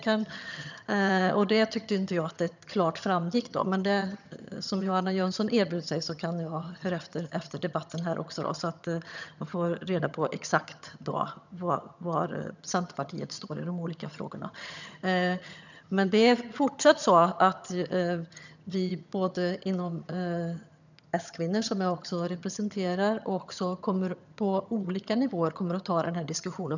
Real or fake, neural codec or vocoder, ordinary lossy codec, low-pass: fake; vocoder, 22.05 kHz, 80 mel bands, HiFi-GAN; none; 7.2 kHz